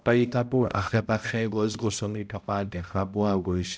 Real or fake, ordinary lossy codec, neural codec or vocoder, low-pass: fake; none; codec, 16 kHz, 0.5 kbps, X-Codec, HuBERT features, trained on balanced general audio; none